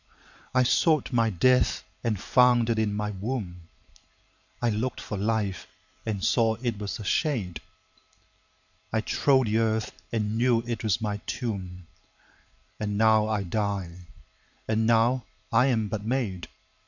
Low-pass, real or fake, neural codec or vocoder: 7.2 kHz; fake; vocoder, 44.1 kHz, 128 mel bands every 512 samples, BigVGAN v2